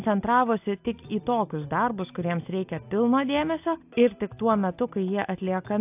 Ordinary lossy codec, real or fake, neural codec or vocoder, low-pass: AAC, 32 kbps; real; none; 3.6 kHz